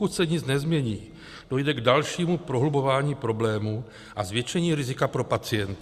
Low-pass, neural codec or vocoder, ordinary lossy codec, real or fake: 14.4 kHz; none; Opus, 64 kbps; real